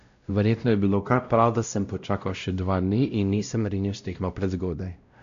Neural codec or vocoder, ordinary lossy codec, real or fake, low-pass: codec, 16 kHz, 0.5 kbps, X-Codec, WavLM features, trained on Multilingual LibriSpeech; AAC, 64 kbps; fake; 7.2 kHz